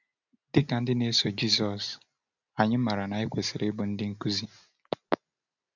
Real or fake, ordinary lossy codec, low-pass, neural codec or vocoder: real; none; 7.2 kHz; none